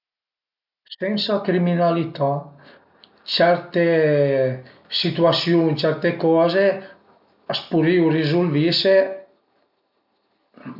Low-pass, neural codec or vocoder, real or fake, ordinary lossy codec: 5.4 kHz; none; real; none